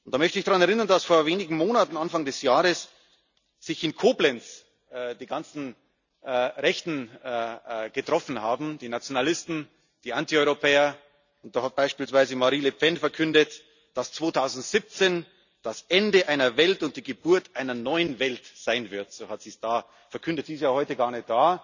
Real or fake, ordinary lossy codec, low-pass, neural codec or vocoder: real; none; 7.2 kHz; none